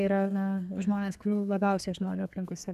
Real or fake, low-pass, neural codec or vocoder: fake; 14.4 kHz; codec, 32 kHz, 1.9 kbps, SNAC